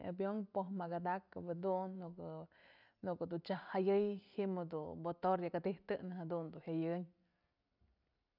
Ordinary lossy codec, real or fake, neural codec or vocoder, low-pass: none; real; none; 5.4 kHz